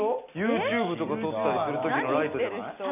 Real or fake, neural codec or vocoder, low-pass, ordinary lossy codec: real; none; 3.6 kHz; none